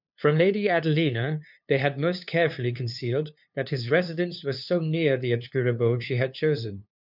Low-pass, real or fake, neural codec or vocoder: 5.4 kHz; fake; codec, 16 kHz, 2 kbps, FunCodec, trained on LibriTTS, 25 frames a second